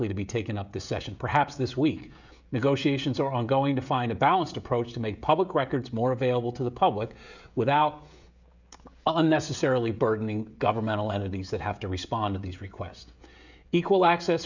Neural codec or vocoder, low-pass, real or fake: codec, 16 kHz, 16 kbps, FreqCodec, smaller model; 7.2 kHz; fake